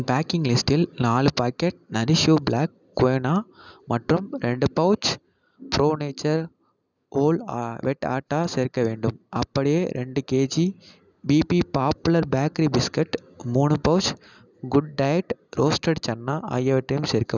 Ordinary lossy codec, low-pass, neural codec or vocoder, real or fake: none; 7.2 kHz; none; real